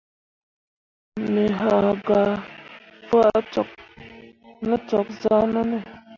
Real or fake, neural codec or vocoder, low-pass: real; none; 7.2 kHz